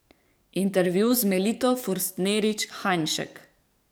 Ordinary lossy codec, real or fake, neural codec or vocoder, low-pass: none; fake; codec, 44.1 kHz, 7.8 kbps, DAC; none